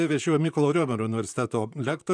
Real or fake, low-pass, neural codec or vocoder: fake; 9.9 kHz; vocoder, 44.1 kHz, 128 mel bands, Pupu-Vocoder